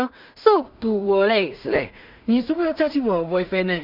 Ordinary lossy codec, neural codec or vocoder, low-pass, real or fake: none; codec, 16 kHz in and 24 kHz out, 0.4 kbps, LongCat-Audio-Codec, two codebook decoder; 5.4 kHz; fake